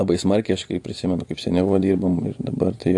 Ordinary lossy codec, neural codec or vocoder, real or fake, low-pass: AAC, 64 kbps; vocoder, 24 kHz, 100 mel bands, Vocos; fake; 10.8 kHz